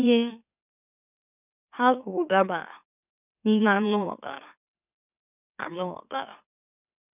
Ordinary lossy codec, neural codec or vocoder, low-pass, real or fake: none; autoencoder, 44.1 kHz, a latent of 192 numbers a frame, MeloTTS; 3.6 kHz; fake